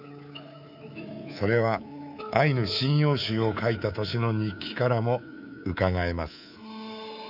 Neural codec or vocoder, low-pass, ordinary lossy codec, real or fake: codec, 24 kHz, 3.1 kbps, DualCodec; 5.4 kHz; none; fake